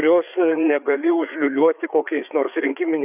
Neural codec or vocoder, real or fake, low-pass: codec, 16 kHz, 4 kbps, FreqCodec, larger model; fake; 3.6 kHz